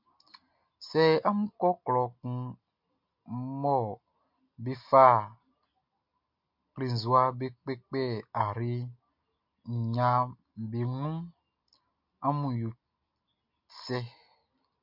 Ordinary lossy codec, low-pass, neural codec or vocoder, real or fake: MP3, 48 kbps; 5.4 kHz; none; real